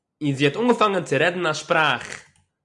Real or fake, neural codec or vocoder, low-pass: real; none; 10.8 kHz